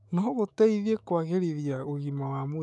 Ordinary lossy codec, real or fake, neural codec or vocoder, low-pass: none; fake; autoencoder, 48 kHz, 128 numbers a frame, DAC-VAE, trained on Japanese speech; 10.8 kHz